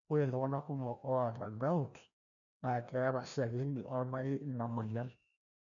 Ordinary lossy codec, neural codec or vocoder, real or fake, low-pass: none; codec, 16 kHz, 1 kbps, FreqCodec, larger model; fake; 7.2 kHz